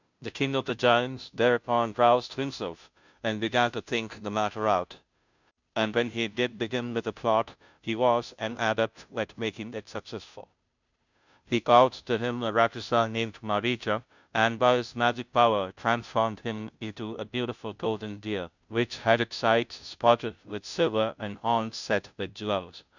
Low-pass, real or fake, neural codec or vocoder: 7.2 kHz; fake; codec, 16 kHz, 0.5 kbps, FunCodec, trained on Chinese and English, 25 frames a second